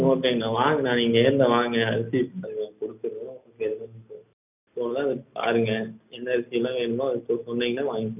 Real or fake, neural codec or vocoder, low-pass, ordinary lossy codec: real; none; 3.6 kHz; none